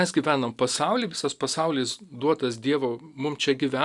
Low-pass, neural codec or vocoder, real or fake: 10.8 kHz; none; real